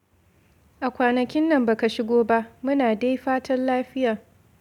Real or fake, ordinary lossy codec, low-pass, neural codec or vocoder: real; none; 19.8 kHz; none